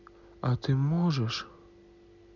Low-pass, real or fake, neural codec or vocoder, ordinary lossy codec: 7.2 kHz; real; none; none